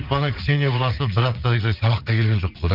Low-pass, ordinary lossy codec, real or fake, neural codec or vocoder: 5.4 kHz; Opus, 32 kbps; fake; vocoder, 44.1 kHz, 80 mel bands, Vocos